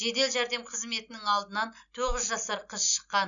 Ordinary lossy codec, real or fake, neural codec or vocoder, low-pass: none; real; none; 7.2 kHz